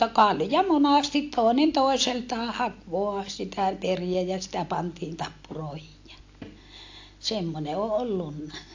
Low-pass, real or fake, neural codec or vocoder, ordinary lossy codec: 7.2 kHz; real; none; AAC, 48 kbps